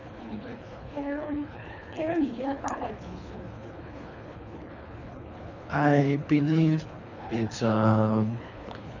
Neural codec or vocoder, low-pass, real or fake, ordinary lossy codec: codec, 24 kHz, 3 kbps, HILCodec; 7.2 kHz; fake; none